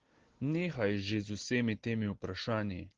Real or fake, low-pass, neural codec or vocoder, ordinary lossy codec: real; 7.2 kHz; none; Opus, 16 kbps